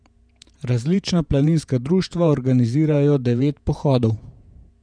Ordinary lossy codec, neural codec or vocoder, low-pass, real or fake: none; vocoder, 48 kHz, 128 mel bands, Vocos; 9.9 kHz; fake